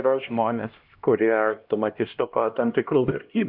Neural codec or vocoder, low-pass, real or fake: codec, 16 kHz, 1 kbps, X-Codec, HuBERT features, trained on LibriSpeech; 5.4 kHz; fake